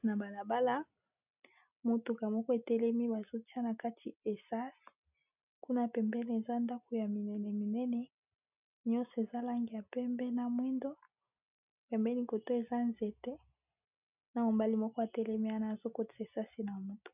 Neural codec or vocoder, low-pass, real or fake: none; 3.6 kHz; real